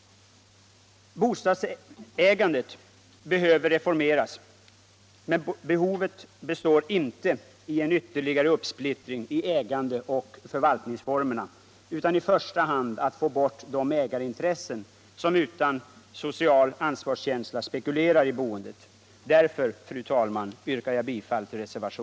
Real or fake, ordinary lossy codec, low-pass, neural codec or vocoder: real; none; none; none